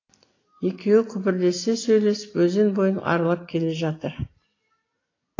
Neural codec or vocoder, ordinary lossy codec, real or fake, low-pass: none; AAC, 32 kbps; real; 7.2 kHz